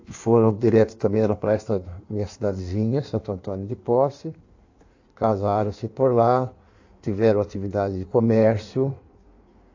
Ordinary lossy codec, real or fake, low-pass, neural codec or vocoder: AAC, 48 kbps; fake; 7.2 kHz; codec, 16 kHz in and 24 kHz out, 1.1 kbps, FireRedTTS-2 codec